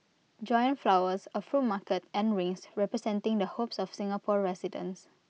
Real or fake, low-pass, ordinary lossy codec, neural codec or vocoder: real; none; none; none